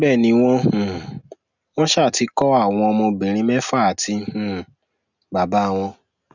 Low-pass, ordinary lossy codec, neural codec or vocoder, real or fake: 7.2 kHz; none; none; real